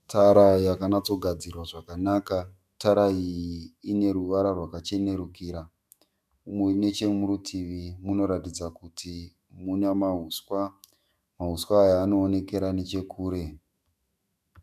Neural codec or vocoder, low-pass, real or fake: autoencoder, 48 kHz, 128 numbers a frame, DAC-VAE, trained on Japanese speech; 14.4 kHz; fake